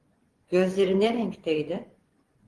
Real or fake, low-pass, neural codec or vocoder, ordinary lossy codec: fake; 10.8 kHz; vocoder, 24 kHz, 100 mel bands, Vocos; Opus, 16 kbps